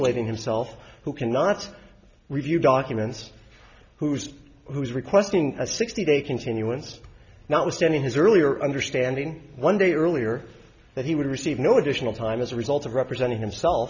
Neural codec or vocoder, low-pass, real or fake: none; 7.2 kHz; real